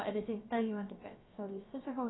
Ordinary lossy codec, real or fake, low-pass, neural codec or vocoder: AAC, 16 kbps; fake; 7.2 kHz; codec, 16 kHz, about 1 kbps, DyCAST, with the encoder's durations